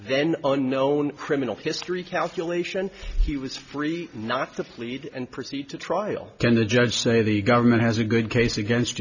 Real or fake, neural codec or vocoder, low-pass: real; none; 7.2 kHz